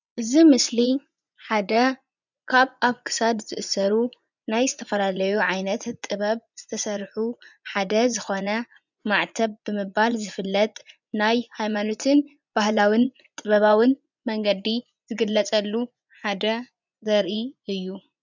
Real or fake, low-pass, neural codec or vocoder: fake; 7.2 kHz; vocoder, 24 kHz, 100 mel bands, Vocos